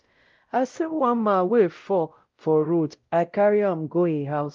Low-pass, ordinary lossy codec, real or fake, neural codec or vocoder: 7.2 kHz; Opus, 32 kbps; fake; codec, 16 kHz, 0.5 kbps, X-Codec, WavLM features, trained on Multilingual LibriSpeech